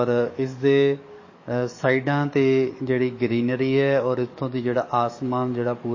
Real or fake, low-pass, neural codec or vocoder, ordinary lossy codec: fake; 7.2 kHz; codec, 44.1 kHz, 7.8 kbps, DAC; MP3, 32 kbps